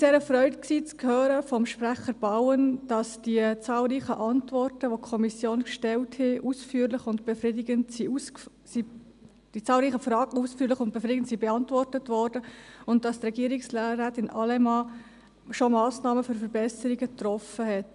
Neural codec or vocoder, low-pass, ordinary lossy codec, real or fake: none; 10.8 kHz; none; real